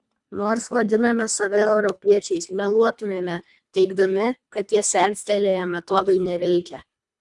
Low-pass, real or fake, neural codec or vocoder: 10.8 kHz; fake; codec, 24 kHz, 1.5 kbps, HILCodec